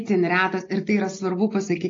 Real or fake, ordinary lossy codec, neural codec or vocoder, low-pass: real; AAC, 32 kbps; none; 7.2 kHz